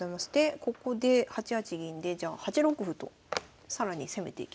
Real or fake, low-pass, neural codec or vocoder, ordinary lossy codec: real; none; none; none